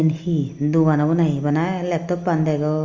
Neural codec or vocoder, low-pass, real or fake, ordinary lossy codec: none; 7.2 kHz; real; Opus, 32 kbps